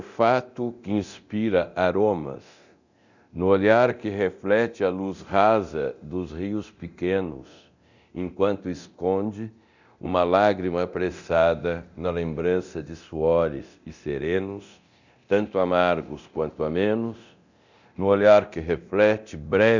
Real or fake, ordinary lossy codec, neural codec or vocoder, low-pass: fake; none; codec, 24 kHz, 0.9 kbps, DualCodec; 7.2 kHz